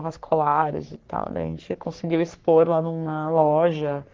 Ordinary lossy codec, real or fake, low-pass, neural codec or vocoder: Opus, 16 kbps; fake; 7.2 kHz; codec, 44.1 kHz, 3.4 kbps, Pupu-Codec